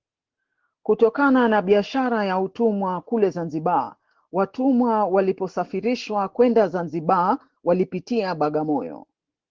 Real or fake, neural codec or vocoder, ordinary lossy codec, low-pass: real; none; Opus, 16 kbps; 7.2 kHz